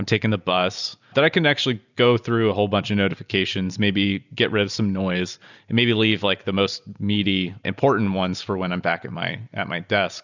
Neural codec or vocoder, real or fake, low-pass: none; real; 7.2 kHz